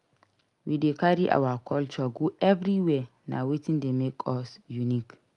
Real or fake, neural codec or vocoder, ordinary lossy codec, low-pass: real; none; Opus, 32 kbps; 10.8 kHz